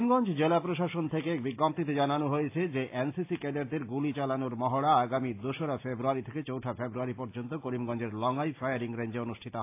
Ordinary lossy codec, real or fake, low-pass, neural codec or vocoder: AAC, 24 kbps; real; 3.6 kHz; none